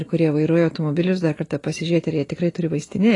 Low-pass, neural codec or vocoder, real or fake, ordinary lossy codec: 10.8 kHz; none; real; AAC, 32 kbps